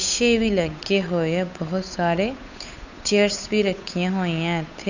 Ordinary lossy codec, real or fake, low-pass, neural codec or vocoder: none; fake; 7.2 kHz; codec, 16 kHz, 8 kbps, FunCodec, trained on Chinese and English, 25 frames a second